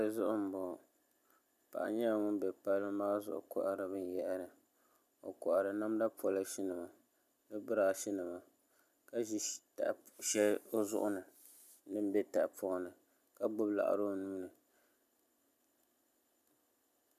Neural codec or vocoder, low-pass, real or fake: none; 14.4 kHz; real